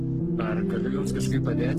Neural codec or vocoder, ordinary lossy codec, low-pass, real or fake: codec, 44.1 kHz, 7.8 kbps, Pupu-Codec; Opus, 16 kbps; 14.4 kHz; fake